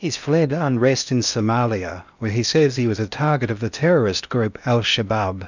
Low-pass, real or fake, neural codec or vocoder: 7.2 kHz; fake; codec, 16 kHz in and 24 kHz out, 0.8 kbps, FocalCodec, streaming, 65536 codes